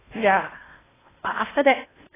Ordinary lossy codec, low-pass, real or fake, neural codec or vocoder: AAC, 16 kbps; 3.6 kHz; fake; codec, 16 kHz in and 24 kHz out, 0.8 kbps, FocalCodec, streaming, 65536 codes